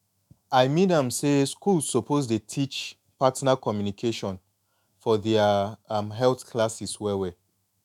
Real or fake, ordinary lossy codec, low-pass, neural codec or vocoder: fake; none; 19.8 kHz; autoencoder, 48 kHz, 128 numbers a frame, DAC-VAE, trained on Japanese speech